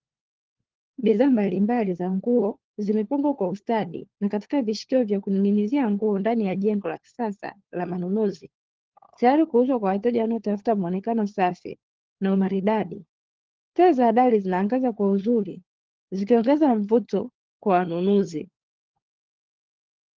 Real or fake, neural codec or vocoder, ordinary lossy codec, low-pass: fake; codec, 16 kHz, 4 kbps, FunCodec, trained on LibriTTS, 50 frames a second; Opus, 16 kbps; 7.2 kHz